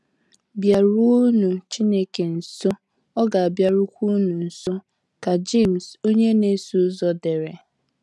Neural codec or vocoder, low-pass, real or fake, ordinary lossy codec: none; none; real; none